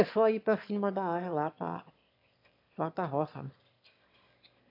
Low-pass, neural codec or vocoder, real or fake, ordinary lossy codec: 5.4 kHz; autoencoder, 22.05 kHz, a latent of 192 numbers a frame, VITS, trained on one speaker; fake; AAC, 48 kbps